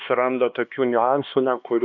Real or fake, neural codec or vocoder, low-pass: fake; codec, 16 kHz, 2 kbps, X-Codec, HuBERT features, trained on LibriSpeech; 7.2 kHz